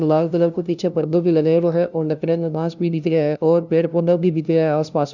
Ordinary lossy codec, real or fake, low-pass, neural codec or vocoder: none; fake; 7.2 kHz; codec, 16 kHz, 0.5 kbps, FunCodec, trained on LibriTTS, 25 frames a second